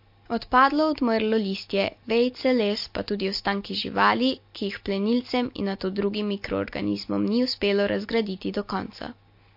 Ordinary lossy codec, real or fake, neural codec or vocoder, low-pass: MP3, 32 kbps; real; none; 5.4 kHz